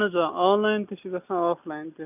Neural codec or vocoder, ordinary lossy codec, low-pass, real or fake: none; none; 3.6 kHz; real